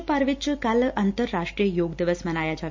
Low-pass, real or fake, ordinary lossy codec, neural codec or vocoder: 7.2 kHz; real; MP3, 64 kbps; none